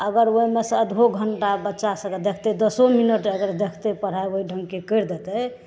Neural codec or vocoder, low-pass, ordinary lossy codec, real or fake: none; none; none; real